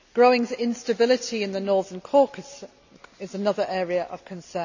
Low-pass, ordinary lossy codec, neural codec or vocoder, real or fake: 7.2 kHz; none; none; real